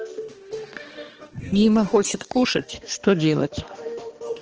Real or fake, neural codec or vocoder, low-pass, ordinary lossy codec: fake; codec, 16 kHz, 2 kbps, X-Codec, HuBERT features, trained on balanced general audio; 7.2 kHz; Opus, 16 kbps